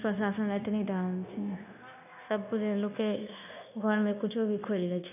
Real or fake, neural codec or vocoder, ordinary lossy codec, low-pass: fake; codec, 16 kHz, 0.9 kbps, LongCat-Audio-Codec; none; 3.6 kHz